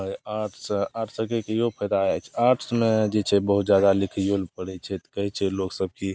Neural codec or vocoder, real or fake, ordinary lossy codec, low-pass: none; real; none; none